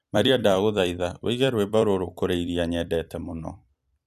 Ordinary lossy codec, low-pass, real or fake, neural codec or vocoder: none; 14.4 kHz; fake; vocoder, 44.1 kHz, 128 mel bands every 256 samples, BigVGAN v2